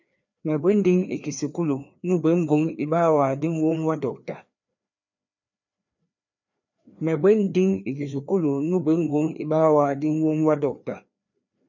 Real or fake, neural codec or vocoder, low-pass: fake; codec, 16 kHz, 2 kbps, FreqCodec, larger model; 7.2 kHz